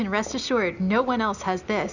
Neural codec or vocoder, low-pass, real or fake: none; 7.2 kHz; real